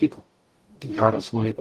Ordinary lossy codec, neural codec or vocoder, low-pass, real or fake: Opus, 24 kbps; codec, 44.1 kHz, 0.9 kbps, DAC; 14.4 kHz; fake